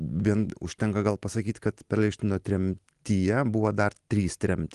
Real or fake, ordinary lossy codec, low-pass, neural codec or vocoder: real; Opus, 24 kbps; 10.8 kHz; none